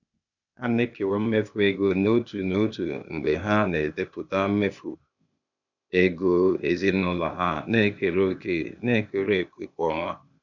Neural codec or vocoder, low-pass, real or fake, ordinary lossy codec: codec, 16 kHz, 0.8 kbps, ZipCodec; 7.2 kHz; fake; none